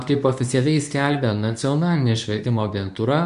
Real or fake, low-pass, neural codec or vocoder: fake; 10.8 kHz; codec, 24 kHz, 0.9 kbps, WavTokenizer, medium speech release version 2